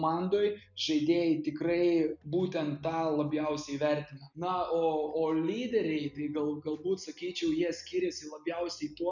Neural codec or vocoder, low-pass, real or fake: none; 7.2 kHz; real